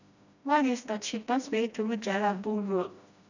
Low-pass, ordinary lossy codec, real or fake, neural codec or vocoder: 7.2 kHz; none; fake; codec, 16 kHz, 1 kbps, FreqCodec, smaller model